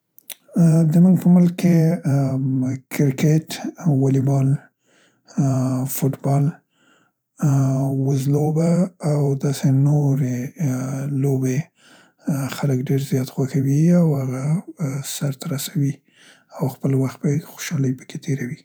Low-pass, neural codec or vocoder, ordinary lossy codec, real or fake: none; vocoder, 44.1 kHz, 128 mel bands every 512 samples, BigVGAN v2; none; fake